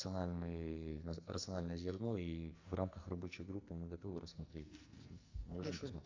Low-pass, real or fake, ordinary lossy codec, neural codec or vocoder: 7.2 kHz; fake; MP3, 64 kbps; codec, 44.1 kHz, 2.6 kbps, SNAC